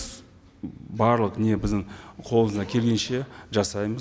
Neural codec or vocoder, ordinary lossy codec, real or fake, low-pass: none; none; real; none